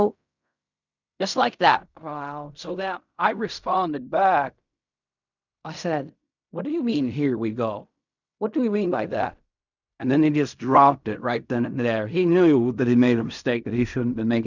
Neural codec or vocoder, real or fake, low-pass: codec, 16 kHz in and 24 kHz out, 0.4 kbps, LongCat-Audio-Codec, fine tuned four codebook decoder; fake; 7.2 kHz